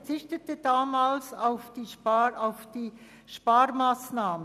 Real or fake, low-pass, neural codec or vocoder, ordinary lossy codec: real; 14.4 kHz; none; none